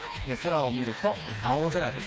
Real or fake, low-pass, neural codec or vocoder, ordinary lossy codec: fake; none; codec, 16 kHz, 2 kbps, FreqCodec, smaller model; none